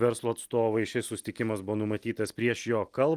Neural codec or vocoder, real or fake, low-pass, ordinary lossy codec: none; real; 14.4 kHz; Opus, 24 kbps